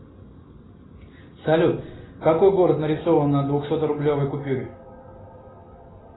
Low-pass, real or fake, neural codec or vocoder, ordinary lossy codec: 7.2 kHz; real; none; AAC, 16 kbps